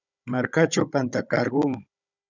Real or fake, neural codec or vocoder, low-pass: fake; codec, 16 kHz, 16 kbps, FunCodec, trained on Chinese and English, 50 frames a second; 7.2 kHz